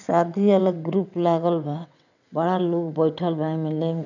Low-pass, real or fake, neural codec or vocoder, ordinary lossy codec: 7.2 kHz; fake; vocoder, 22.05 kHz, 80 mel bands, WaveNeXt; none